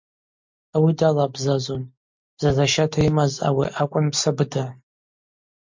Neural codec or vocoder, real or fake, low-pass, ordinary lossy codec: none; real; 7.2 kHz; MP3, 48 kbps